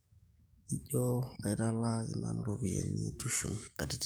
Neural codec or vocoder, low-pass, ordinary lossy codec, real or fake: codec, 44.1 kHz, 7.8 kbps, DAC; none; none; fake